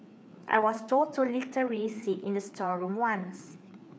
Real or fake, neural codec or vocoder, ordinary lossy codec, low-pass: fake; codec, 16 kHz, 4 kbps, FreqCodec, larger model; none; none